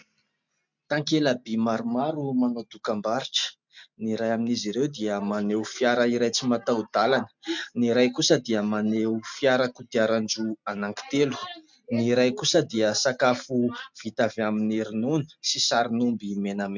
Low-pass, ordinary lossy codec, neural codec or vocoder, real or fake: 7.2 kHz; MP3, 64 kbps; none; real